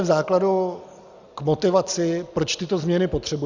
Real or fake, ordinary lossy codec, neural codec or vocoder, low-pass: real; Opus, 64 kbps; none; 7.2 kHz